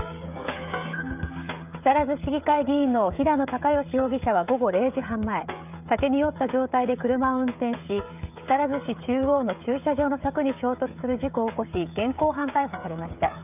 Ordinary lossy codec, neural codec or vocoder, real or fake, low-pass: none; codec, 16 kHz, 16 kbps, FreqCodec, smaller model; fake; 3.6 kHz